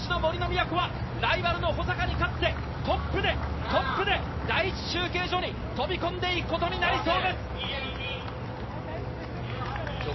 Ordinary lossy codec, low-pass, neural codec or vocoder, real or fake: MP3, 24 kbps; 7.2 kHz; none; real